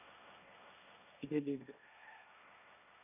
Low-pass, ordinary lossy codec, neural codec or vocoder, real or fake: 3.6 kHz; none; codec, 16 kHz, 1.1 kbps, Voila-Tokenizer; fake